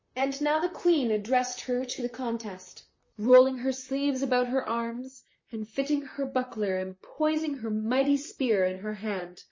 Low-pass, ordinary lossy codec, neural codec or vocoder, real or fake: 7.2 kHz; MP3, 32 kbps; vocoder, 44.1 kHz, 128 mel bands, Pupu-Vocoder; fake